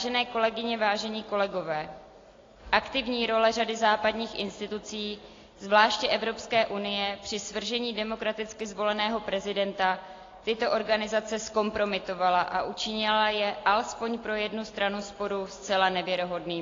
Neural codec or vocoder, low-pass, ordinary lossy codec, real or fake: none; 7.2 kHz; AAC, 32 kbps; real